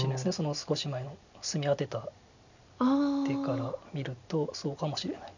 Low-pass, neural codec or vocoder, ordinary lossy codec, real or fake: 7.2 kHz; none; none; real